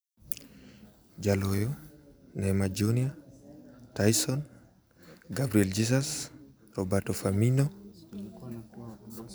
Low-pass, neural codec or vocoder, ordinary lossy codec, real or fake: none; none; none; real